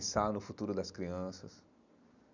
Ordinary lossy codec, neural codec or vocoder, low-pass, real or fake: none; none; 7.2 kHz; real